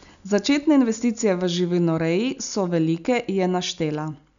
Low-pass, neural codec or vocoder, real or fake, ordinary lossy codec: 7.2 kHz; none; real; none